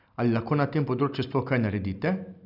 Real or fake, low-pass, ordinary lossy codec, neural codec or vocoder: real; 5.4 kHz; none; none